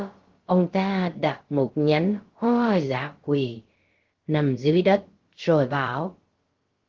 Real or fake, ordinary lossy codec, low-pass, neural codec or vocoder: fake; Opus, 16 kbps; 7.2 kHz; codec, 16 kHz, about 1 kbps, DyCAST, with the encoder's durations